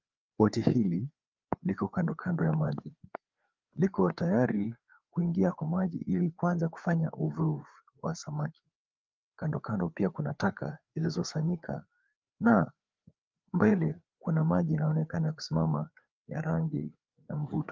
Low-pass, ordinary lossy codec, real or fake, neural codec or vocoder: 7.2 kHz; Opus, 32 kbps; fake; codec, 16 kHz, 4 kbps, FreqCodec, larger model